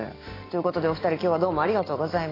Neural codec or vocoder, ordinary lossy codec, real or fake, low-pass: none; AAC, 24 kbps; real; 5.4 kHz